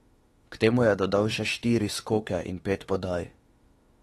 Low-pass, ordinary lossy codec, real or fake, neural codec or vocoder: 19.8 kHz; AAC, 32 kbps; fake; autoencoder, 48 kHz, 32 numbers a frame, DAC-VAE, trained on Japanese speech